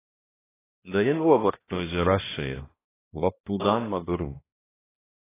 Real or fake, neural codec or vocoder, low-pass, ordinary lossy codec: fake; codec, 16 kHz, 1 kbps, X-Codec, HuBERT features, trained on balanced general audio; 3.6 kHz; AAC, 16 kbps